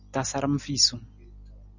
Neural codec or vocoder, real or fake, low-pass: none; real; 7.2 kHz